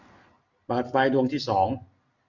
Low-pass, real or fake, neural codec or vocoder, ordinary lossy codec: 7.2 kHz; real; none; none